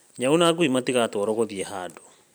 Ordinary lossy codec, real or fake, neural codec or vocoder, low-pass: none; fake; vocoder, 44.1 kHz, 128 mel bands every 512 samples, BigVGAN v2; none